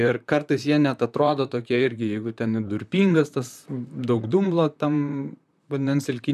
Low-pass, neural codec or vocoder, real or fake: 14.4 kHz; vocoder, 44.1 kHz, 128 mel bands, Pupu-Vocoder; fake